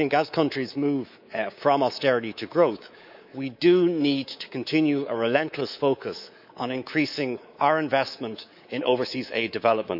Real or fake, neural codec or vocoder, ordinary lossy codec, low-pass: fake; codec, 24 kHz, 3.1 kbps, DualCodec; none; 5.4 kHz